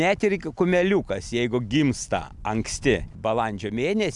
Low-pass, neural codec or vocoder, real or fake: 10.8 kHz; none; real